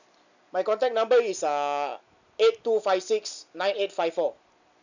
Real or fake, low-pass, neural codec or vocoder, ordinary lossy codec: real; 7.2 kHz; none; none